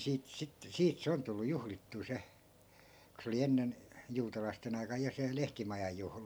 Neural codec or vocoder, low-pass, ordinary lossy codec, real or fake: none; none; none; real